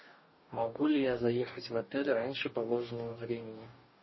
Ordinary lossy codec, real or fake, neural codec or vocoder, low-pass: MP3, 24 kbps; fake; codec, 44.1 kHz, 2.6 kbps, DAC; 7.2 kHz